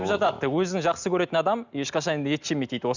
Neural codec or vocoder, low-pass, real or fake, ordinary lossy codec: none; 7.2 kHz; real; none